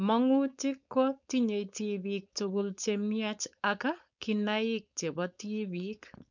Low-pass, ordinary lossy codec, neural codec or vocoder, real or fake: 7.2 kHz; none; codec, 16 kHz, 4.8 kbps, FACodec; fake